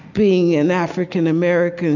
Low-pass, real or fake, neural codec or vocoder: 7.2 kHz; real; none